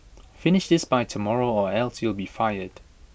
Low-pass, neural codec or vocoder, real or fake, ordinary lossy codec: none; none; real; none